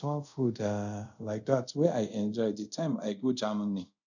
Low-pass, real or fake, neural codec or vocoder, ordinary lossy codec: 7.2 kHz; fake; codec, 24 kHz, 0.5 kbps, DualCodec; none